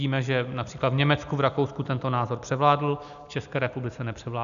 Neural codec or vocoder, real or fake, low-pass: none; real; 7.2 kHz